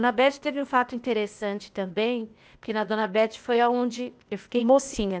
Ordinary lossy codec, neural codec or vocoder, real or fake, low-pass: none; codec, 16 kHz, 0.8 kbps, ZipCodec; fake; none